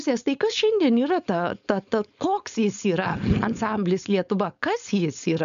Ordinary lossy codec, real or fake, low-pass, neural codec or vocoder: MP3, 96 kbps; fake; 7.2 kHz; codec, 16 kHz, 4.8 kbps, FACodec